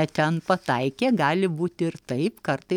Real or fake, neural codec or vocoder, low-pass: real; none; 19.8 kHz